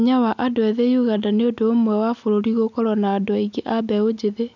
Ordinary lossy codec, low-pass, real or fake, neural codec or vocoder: none; 7.2 kHz; real; none